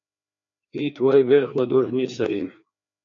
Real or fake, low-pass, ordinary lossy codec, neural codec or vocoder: fake; 7.2 kHz; MP3, 48 kbps; codec, 16 kHz, 2 kbps, FreqCodec, larger model